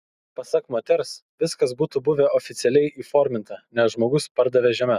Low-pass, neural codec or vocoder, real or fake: 14.4 kHz; none; real